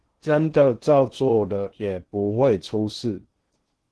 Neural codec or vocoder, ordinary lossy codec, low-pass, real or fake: codec, 16 kHz in and 24 kHz out, 0.6 kbps, FocalCodec, streaming, 2048 codes; Opus, 16 kbps; 10.8 kHz; fake